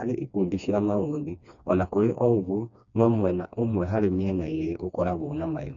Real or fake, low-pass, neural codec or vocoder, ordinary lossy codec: fake; 7.2 kHz; codec, 16 kHz, 2 kbps, FreqCodec, smaller model; none